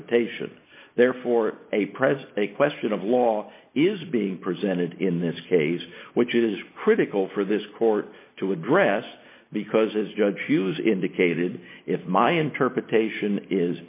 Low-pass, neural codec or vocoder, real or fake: 3.6 kHz; none; real